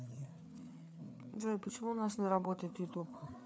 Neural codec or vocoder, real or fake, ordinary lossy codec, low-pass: codec, 16 kHz, 4 kbps, FreqCodec, larger model; fake; none; none